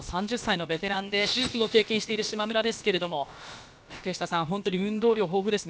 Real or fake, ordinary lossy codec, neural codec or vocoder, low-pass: fake; none; codec, 16 kHz, about 1 kbps, DyCAST, with the encoder's durations; none